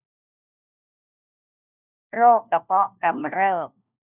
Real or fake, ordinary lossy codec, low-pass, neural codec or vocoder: fake; none; 3.6 kHz; codec, 16 kHz, 1 kbps, FunCodec, trained on LibriTTS, 50 frames a second